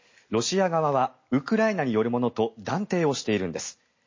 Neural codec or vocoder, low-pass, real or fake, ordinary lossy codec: none; 7.2 kHz; real; MP3, 32 kbps